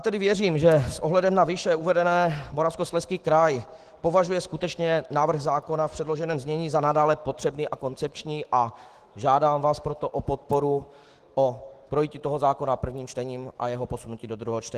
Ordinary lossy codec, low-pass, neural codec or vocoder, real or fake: Opus, 16 kbps; 14.4 kHz; autoencoder, 48 kHz, 128 numbers a frame, DAC-VAE, trained on Japanese speech; fake